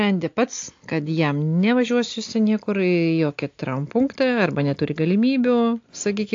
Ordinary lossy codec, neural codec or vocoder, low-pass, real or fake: AAC, 48 kbps; none; 7.2 kHz; real